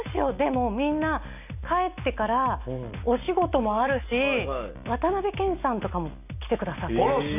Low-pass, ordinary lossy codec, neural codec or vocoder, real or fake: 3.6 kHz; none; none; real